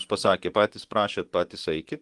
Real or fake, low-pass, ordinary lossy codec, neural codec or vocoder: fake; 10.8 kHz; Opus, 24 kbps; vocoder, 24 kHz, 100 mel bands, Vocos